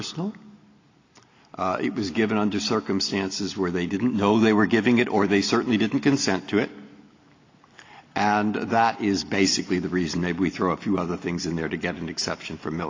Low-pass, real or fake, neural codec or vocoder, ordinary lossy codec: 7.2 kHz; real; none; AAC, 32 kbps